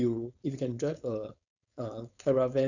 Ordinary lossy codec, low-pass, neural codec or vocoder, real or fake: none; 7.2 kHz; codec, 16 kHz, 4.8 kbps, FACodec; fake